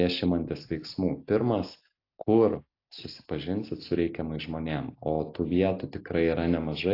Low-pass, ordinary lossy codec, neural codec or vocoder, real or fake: 5.4 kHz; AAC, 32 kbps; none; real